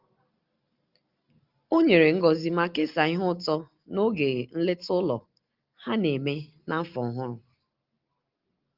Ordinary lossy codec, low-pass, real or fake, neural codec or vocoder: Opus, 32 kbps; 5.4 kHz; real; none